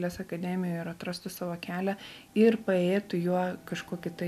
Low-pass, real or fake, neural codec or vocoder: 14.4 kHz; real; none